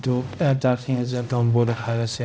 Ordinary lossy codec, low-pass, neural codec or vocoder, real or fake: none; none; codec, 16 kHz, 0.5 kbps, X-Codec, HuBERT features, trained on balanced general audio; fake